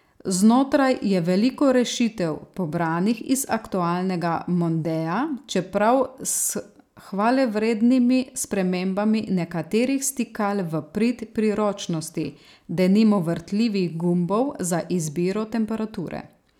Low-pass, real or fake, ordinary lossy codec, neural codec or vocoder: 19.8 kHz; real; none; none